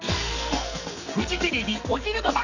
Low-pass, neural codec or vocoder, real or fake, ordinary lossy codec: 7.2 kHz; codec, 44.1 kHz, 2.6 kbps, SNAC; fake; none